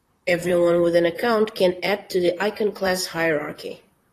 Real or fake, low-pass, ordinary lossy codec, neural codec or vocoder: fake; 14.4 kHz; AAC, 48 kbps; vocoder, 44.1 kHz, 128 mel bands, Pupu-Vocoder